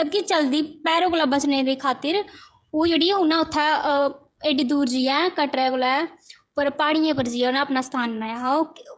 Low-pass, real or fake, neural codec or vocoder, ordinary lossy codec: none; fake; codec, 16 kHz, 16 kbps, FreqCodec, smaller model; none